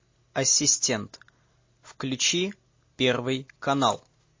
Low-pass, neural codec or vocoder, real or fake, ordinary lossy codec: 7.2 kHz; none; real; MP3, 32 kbps